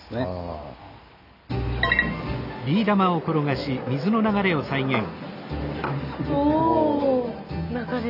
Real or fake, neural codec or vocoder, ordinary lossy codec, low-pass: real; none; none; 5.4 kHz